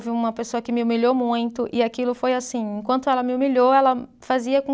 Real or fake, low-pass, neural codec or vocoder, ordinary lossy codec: real; none; none; none